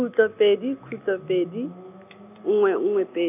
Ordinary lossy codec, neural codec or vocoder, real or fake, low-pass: none; none; real; 3.6 kHz